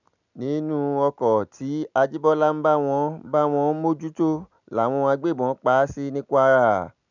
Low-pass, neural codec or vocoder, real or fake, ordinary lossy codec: 7.2 kHz; none; real; none